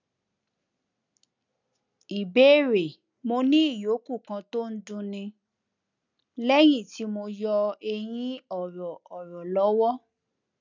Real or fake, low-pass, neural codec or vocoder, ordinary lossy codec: real; 7.2 kHz; none; none